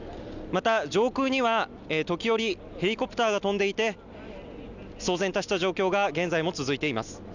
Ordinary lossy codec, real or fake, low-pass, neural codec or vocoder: none; real; 7.2 kHz; none